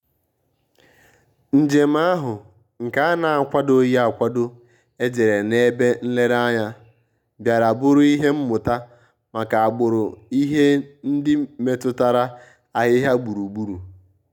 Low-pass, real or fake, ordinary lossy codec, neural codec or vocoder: 19.8 kHz; real; none; none